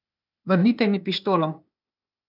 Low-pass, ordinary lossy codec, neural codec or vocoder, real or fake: 5.4 kHz; none; codec, 16 kHz, 0.8 kbps, ZipCodec; fake